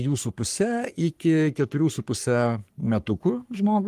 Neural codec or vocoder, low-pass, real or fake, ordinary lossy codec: codec, 44.1 kHz, 3.4 kbps, Pupu-Codec; 14.4 kHz; fake; Opus, 32 kbps